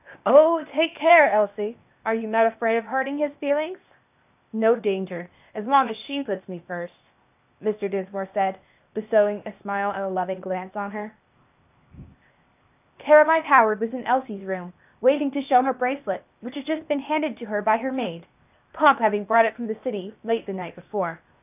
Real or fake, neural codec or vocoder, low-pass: fake; codec, 16 kHz, 0.8 kbps, ZipCodec; 3.6 kHz